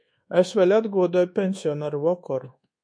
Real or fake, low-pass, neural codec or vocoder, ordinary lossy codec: fake; 9.9 kHz; codec, 24 kHz, 1.2 kbps, DualCodec; MP3, 48 kbps